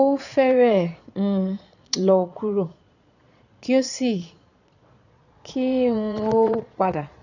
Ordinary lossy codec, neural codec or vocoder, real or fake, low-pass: none; vocoder, 22.05 kHz, 80 mel bands, Vocos; fake; 7.2 kHz